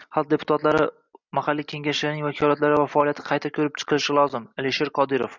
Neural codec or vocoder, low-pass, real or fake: none; 7.2 kHz; real